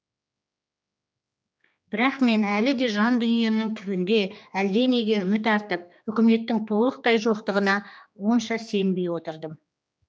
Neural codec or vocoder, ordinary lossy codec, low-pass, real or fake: codec, 16 kHz, 2 kbps, X-Codec, HuBERT features, trained on general audio; none; none; fake